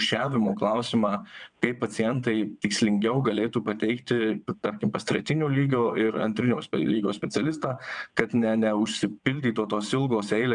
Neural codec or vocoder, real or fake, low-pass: vocoder, 22.05 kHz, 80 mel bands, WaveNeXt; fake; 9.9 kHz